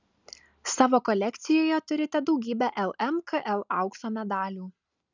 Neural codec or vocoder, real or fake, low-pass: none; real; 7.2 kHz